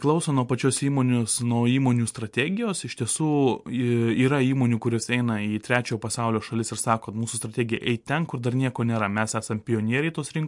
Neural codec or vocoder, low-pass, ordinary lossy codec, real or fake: none; 10.8 kHz; MP3, 64 kbps; real